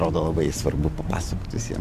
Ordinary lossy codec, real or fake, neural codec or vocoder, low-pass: AAC, 96 kbps; real; none; 14.4 kHz